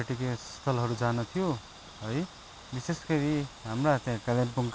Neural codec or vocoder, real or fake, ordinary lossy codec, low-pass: none; real; none; none